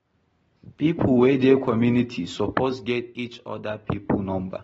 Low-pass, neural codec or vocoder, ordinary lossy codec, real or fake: 10.8 kHz; none; AAC, 24 kbps; real